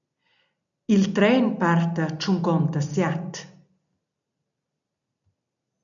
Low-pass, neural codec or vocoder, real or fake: 7.2 kHz; none; real